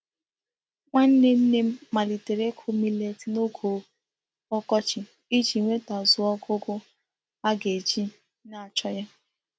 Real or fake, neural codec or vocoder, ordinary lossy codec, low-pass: real; none; none; none